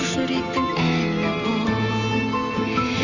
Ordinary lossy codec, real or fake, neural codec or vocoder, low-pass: none; real; none; 7.2 kHz